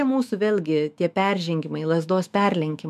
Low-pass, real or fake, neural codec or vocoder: 14.4 kHz; fake; autoencoder, 48 kHz, 128 numbers a frame, DAC-VAE, trained on Japanese speech